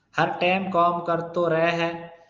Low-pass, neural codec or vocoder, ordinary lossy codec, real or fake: 7.2 kHz; none; Opus, 24 kbps; real